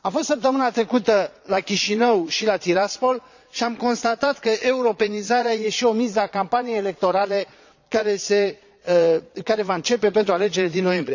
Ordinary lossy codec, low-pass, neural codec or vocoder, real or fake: none; 7.2 kHz; vocoder, 22.05 kHz, 80 mel bands, Vocos; fake